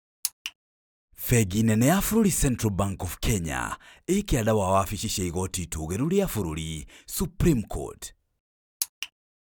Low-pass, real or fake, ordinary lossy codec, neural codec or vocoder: none; real; none; none